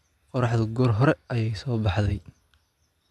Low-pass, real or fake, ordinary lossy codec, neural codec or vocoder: none; real; none; none